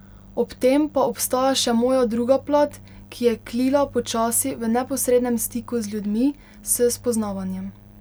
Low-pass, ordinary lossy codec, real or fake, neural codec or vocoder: none; none; real; none